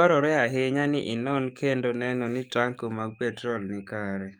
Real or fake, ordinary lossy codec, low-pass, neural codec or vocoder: fake; none; 19.8 kHz; codec, 44.1 kHz, 7.8 kbps, DAC